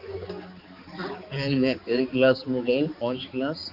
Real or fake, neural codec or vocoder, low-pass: fake; codec, 16 kHz, 4 kbps, X-Codec, HuBERT features, trained on balanced general audio; 5.4 kHz